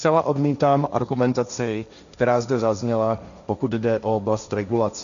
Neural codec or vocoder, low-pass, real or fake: codec, 16 kHz, 1.1 kbps, Voila-Tokenizer; 7.2 kHz; fake